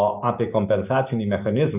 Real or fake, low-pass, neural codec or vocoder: real; 3.6 kHz; none